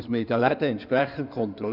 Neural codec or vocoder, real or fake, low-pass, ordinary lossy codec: codec, 16 kHz in and 24 kHz out, 2.2 kbps, FireRedTTS-2 codec; fake; 5.4 kHz; none